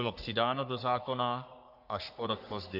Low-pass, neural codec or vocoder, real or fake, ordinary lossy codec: 5.4 kHz; codec, 44.1 kHz, 3.4 kbps, Pupu-Codec; fake; AAC, 48 kbps